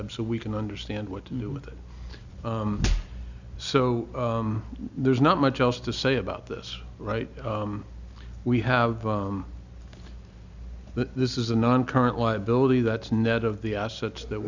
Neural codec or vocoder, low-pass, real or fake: none; 7.2 kHz; real